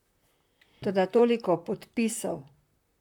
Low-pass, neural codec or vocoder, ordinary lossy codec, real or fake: 19.8 kHz; vocoder, 44.1 kHz, 128 mel bands, Pupu-Vocoder; none; fake